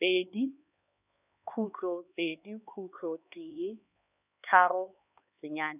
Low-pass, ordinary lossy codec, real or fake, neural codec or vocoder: 3.6 kHz; none; fake; codec, 16 kHz, 4 kbps, X-Codec, HuBERT features, trained on LibriSpeech